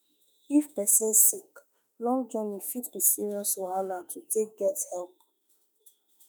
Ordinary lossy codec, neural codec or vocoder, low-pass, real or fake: none; autoencoder, 48 kHz, 32 numbers a frame, DAC-VAE, trained on Japanese speech; none; fake